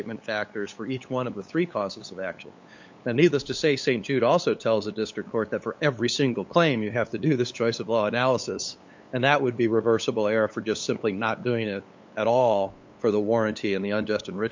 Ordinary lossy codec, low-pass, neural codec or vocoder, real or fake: MP3, 48 kbps; 7.2 kHz; codec, 16 kHz, 8 kbps, FunCodec, trained on LibriTTS, 25 frames a second; fake